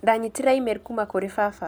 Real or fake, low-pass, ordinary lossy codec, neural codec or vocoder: real; none; none; none